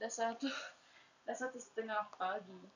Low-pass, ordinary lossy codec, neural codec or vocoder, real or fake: 7.2 kHz; none; vocoder, 44.1 kHz, 128 mel bands every 256 samples, BigVGAN v2; fake